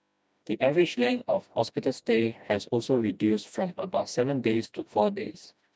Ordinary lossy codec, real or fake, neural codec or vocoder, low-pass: none; fake; codec, 16 kHz, 1 kbps, FreqCodec, smaller model; none